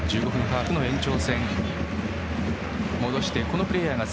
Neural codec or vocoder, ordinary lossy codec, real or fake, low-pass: none; none; real; none